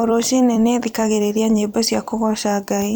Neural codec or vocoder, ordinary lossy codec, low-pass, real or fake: vocoder, 44.1 kHz, 128 mel bands every 256 samples, BigVGAN v2; none; none; fake